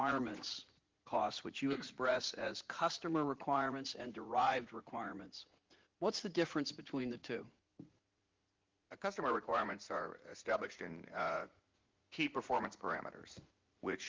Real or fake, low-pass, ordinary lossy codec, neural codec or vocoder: fake; 7.2 kHz; Opus, 32 kbps; vocoder, 44.1 kHz, 80 mel bands, Vocos